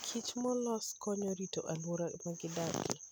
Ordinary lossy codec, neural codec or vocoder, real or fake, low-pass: none; none; real; none